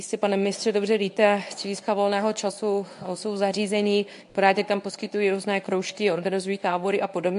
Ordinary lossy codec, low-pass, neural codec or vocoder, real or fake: AAC, 64 kbps; 10.8 kHz; codec, 24 kHz, 0.9 kbps, WavTokenizer, medium speech release version 1; fake